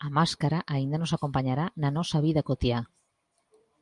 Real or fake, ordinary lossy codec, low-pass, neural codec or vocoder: real; Opus, 32 kbps; 10.8 kHz; none